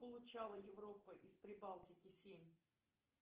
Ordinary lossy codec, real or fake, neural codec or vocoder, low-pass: Opus, 16 kbps; real; none; 3.6 kHz